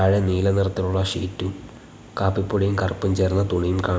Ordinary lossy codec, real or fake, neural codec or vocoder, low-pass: none; real; none; none